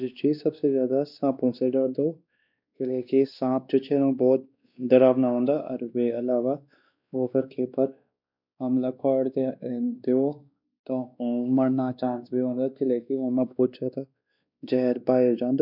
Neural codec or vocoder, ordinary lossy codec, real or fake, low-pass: codec, 16 kHz, 2 kbps, X-Codec, WavLM features, trained on Multilingual LibriSpeech; none; fake; 5.4 kHz